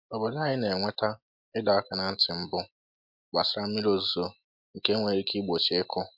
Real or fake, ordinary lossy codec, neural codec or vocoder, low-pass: real; MP3, 48 kbps; none; 5.4 kHz